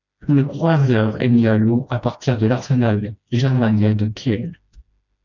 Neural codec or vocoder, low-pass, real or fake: codec, 16 kHz, 1 kbps, FreqCodec, smaller model; 7.2 kHz; fake